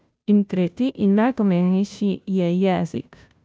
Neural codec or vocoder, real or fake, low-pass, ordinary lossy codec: codec, 16 kHz, 0.5 kbps, FunCodec, trained on Chinese and English, 25 frames a second; fake; none; none